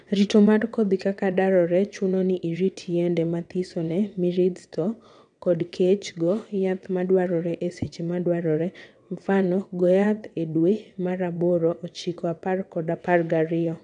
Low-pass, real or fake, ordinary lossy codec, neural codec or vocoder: 9.9 kHz; fake; none; vocoder, 22.05 kHz, 80 mel bands, WaveNeXt